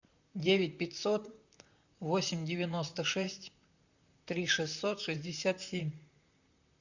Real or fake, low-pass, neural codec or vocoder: fake; 7.2 kHz; vocoder, 44.1 kHz, 128 mel bands, Pupu-Vocoder